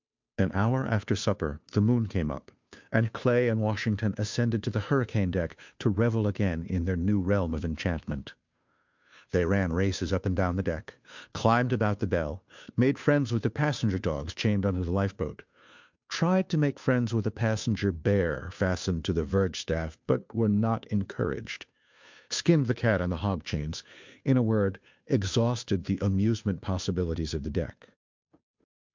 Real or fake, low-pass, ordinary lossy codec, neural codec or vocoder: fake; 7.2 kHz; MP3, 64 kbps; codec, 16 kHz, 2 kbps, FunCodec, trained on Chinese and English, 25 frames a second